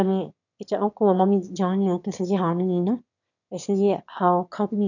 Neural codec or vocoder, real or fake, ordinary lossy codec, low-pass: autoencoder, 22.05 kHz, a latent of 192 numbers a frame, VITS, trained on one speaker; fake; none; 7.2 kHz